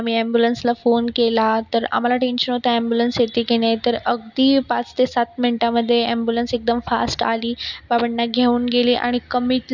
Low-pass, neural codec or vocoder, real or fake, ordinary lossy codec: 7.2 kHz; none; real; none